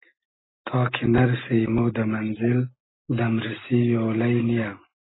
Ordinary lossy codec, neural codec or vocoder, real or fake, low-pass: AAC, 16 kbps; none; real; 7.2 kHz